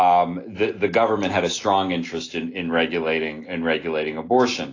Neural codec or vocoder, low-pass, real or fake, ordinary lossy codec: none; 7.2 kHz; real; AAC, 32 kbps